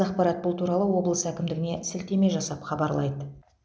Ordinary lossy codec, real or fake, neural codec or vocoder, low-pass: none; real; none; none